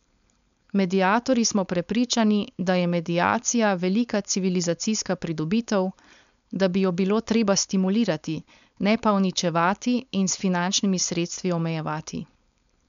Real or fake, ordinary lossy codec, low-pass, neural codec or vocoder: fake; none; 7.2 kHz; codec, 16 kHz, 4.8 kbps, FACodec